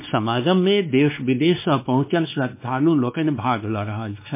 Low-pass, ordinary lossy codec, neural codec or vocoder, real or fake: 3.6 kHz; MP3, 24 kbps; codec, 16 kHz, 2 kbps, X-Codec, WavLM features, trained on Multilingual LibriSpeech; fake